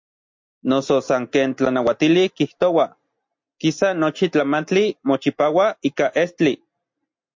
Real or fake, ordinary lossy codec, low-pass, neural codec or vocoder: real; MP3, 48 kbps; 7.2 kHz; none